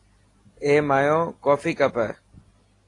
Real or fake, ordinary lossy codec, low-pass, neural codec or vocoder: real; AAC, 32 kbps; 10.8 kHz; none